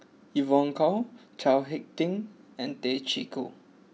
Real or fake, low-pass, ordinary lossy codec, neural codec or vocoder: real; none; none; none